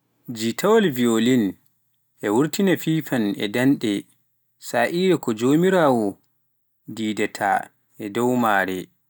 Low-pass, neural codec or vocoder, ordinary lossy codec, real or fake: none; none; none; real